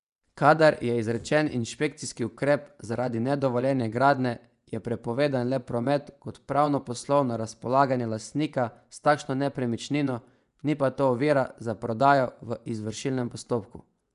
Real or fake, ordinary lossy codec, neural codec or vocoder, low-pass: fake; none; vocoder, 24 kHz, 100 mel bands, Vocos; 10.8 kHz